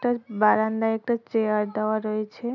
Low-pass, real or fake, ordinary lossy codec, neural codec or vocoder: 7.2 kHz; real; none; none